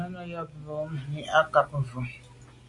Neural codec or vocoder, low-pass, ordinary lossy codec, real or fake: none; 10.8 kHz; AAC, 64 kbps; real